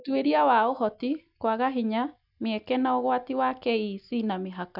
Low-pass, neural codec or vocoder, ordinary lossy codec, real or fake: 5.4 kHz; none; none; real